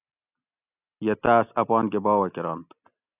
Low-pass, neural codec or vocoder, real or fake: 3.6 kHz; none; real